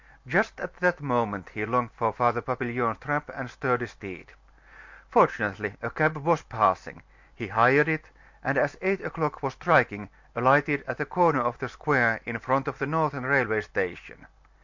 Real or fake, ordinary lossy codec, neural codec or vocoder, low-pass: real; MP3, 48 kbps; none; 7.2 kHz